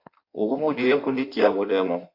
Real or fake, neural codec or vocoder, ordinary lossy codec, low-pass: fake; codec, 16 kHz in and 24 kHz out, 1.1 kbps, FireRedTTS-2 codec; MP3, 48 kbps; 5.4 kHz